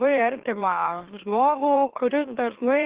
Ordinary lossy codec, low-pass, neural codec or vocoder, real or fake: Opus, 16 kbps; 3.6 kHz; autoencoder, 44.1 kHz, a latent of 192 numbers a frame, MeloTTS; fake